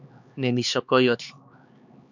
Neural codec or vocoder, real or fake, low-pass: codec, 16 kHz, 4 kbps, X-Codec, HuBERT features, trained on LibriSpeech; fake; 7.2 kHz